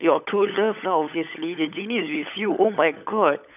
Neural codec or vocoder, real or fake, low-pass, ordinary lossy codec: codec, 16 kHz, 16 kbps, FunCodec, trained on LibriTTS, 50 frames a second; fake; 3.6 kHz; none